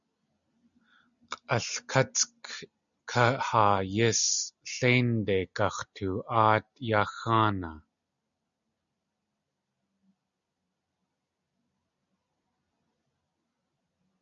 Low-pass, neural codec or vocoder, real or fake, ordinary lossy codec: 7.2 kHz; none; real; MP3, 96 kbps